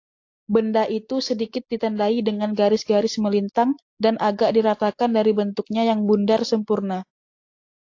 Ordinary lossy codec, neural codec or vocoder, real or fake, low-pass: AAC, 48 kbps; none; real; 7.2 kHz